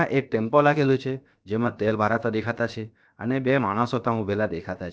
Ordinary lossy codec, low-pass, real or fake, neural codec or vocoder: none; none; fake; codec, 16 kHz, 0.7 kbps, FocalCodec